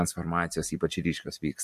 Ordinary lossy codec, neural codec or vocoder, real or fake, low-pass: MP3, 64 kbps; none; real; 14.4 kHz